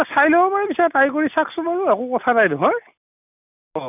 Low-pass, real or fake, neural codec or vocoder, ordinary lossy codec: 3.6 kHz; real; none; none